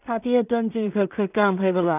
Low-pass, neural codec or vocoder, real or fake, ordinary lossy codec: 3.6 kHz; codec, 16 kHz in and 24 kHz out, 0.4 kbps, LongCat-Audio-Codec, two codebook decoder; fake; none